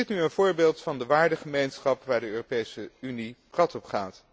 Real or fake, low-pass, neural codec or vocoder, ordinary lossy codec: real; none; none; none